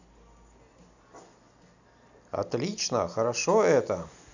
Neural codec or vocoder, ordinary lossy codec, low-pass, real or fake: none; none; 7.2 kHz; real